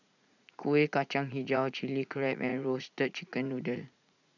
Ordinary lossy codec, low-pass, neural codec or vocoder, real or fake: none; 7.2 kHz; vocoder, 44.1 kHz, 80 mel bands, Vocos; fake